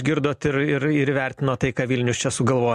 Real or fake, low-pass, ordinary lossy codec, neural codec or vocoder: real; 14.4 kHz; MP3, 64 kbps; none